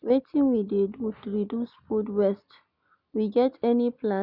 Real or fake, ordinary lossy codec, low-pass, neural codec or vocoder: real; none; 5.4 kHz; none